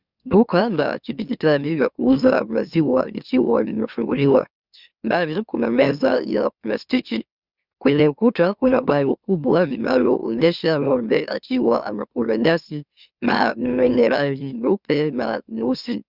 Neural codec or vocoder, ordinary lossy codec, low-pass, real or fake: autoencoder, 44.1 kHz, a latent of 192 numbers a frame, MeloTTS; Opus, 64 kbps; 5.4 kHz; fake